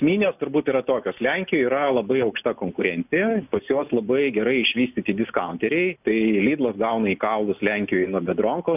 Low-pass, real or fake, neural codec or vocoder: 3.6 kHz; real; none